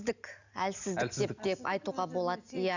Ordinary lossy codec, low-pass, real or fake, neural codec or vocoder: none; 7.2 kHz; real; none